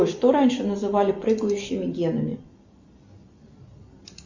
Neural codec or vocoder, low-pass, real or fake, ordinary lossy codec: none; 7.2 kHz; real; Opus, 64 kbps